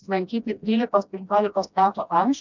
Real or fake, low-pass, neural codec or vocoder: fake; 7.2 kHz; codec, 16 kHz, 1 kbps, FreqCodec, smaller model